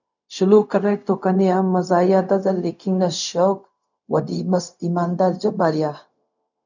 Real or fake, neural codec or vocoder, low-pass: fake; codec, 16 kHz, 0.4 kbps, LongCat-Audio-Codec; 7.2 kHz